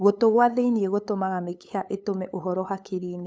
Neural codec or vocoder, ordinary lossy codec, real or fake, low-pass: codec, 16 kHz, 8 kbps, FunCodec, trained on LibriTTS, 25 frames a second; none; fake; none